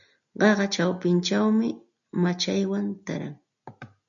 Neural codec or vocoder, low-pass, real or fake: none; 7.2 kHz; real